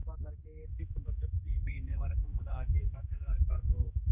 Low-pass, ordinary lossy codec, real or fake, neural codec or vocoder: 3.6 kHz; none; fake; codec, 16 kHz, 2 kbps, X-Codec, HuBERT features, trained on general audio